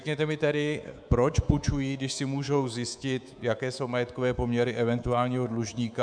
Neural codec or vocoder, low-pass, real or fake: codec, 24 kHz, 3.1 kbps, DualCodec; 9.9 kHz; fake